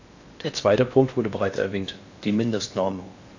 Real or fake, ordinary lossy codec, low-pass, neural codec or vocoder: fake; AAC, 48 kbps; 7.2 kHz; codec, 16 kHz in and 24 kHz out, 0.8 kbps, FocalCodec, streaming, 65536 codes